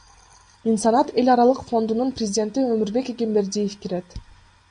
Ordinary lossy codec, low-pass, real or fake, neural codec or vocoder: AAC, 96 kbps; 9.9 kHz; real; none